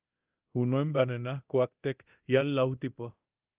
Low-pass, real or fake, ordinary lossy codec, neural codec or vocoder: 3.6 kHz; fake; Opus, 32 kbps; codec, 24 kHz, 0.9 kbps, DualCodec